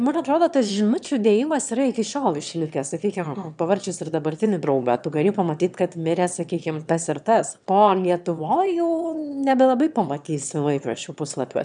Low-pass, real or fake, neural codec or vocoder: 9.9 kHz; fake; autoencoder, 22.05 kHz, a latent of 192 numbers a frame, VITS, trained on one speaker